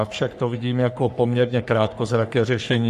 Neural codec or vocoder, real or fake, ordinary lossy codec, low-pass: codec, 44.1 kHz, 3.4 kbps, Pupu-Codec; fake; AAC, 96 kbps; 14.4 kHz